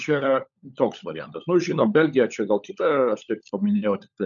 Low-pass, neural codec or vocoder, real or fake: 7.2 kHz; codec, 16 kHz, 16 kbps, FunCodec, trained on LibriTTS, 50 frames a second; fake